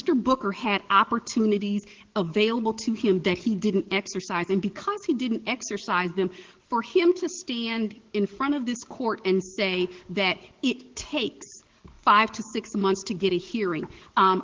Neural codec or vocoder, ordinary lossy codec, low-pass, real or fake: codec, 44.1 kHz, 7.8 kbps, DAC; Opus, 16 kbps; 7.2 kHz; fake